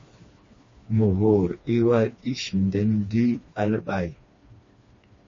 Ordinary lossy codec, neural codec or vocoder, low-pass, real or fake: MP3, 32 kbps; codec, 16 kHz, 2 kbps, FreqCodec, smaller model; 7.2 kHz; fake